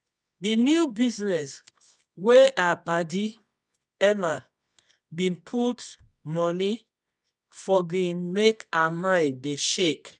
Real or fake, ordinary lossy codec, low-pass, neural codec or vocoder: fake; none; none; codec, 24 kHz, 0.9 kbps, WavTokenizer, medium music audio release